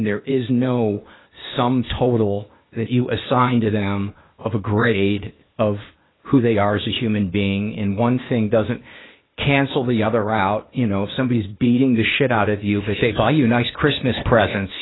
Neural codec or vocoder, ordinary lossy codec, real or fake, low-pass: codec, 16 kHz, 0.8 kbps, ZipCodec; AAC, 16 kbps; fake; 7.2 kHz